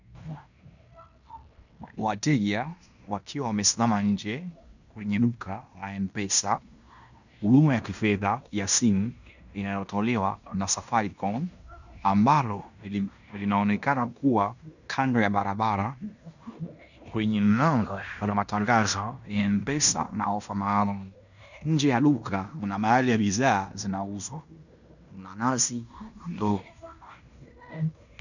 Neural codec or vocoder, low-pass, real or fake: codec, 16 kHz in and 24 kHz out, 0.9 kbps, LongCat-Audio-Codec, fine tuned four codebook decoder; 7.2 kHz; fake